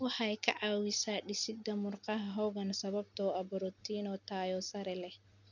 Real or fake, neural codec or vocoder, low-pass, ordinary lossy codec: real; none; 7.2 kHz; none